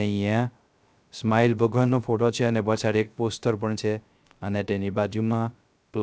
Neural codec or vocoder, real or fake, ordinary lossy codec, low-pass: codec, 16 kHz, 0.3 kbps, FocalCodec; fake; none; none